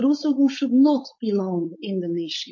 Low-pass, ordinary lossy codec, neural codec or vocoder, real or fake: 7.2 kHz; MP3, 32 kbps; codec, 16 kHz, 4.8 kbps, FACodec; fake